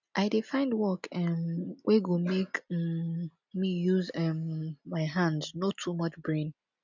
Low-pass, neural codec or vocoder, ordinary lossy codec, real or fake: 7.2 kHz; none; none; real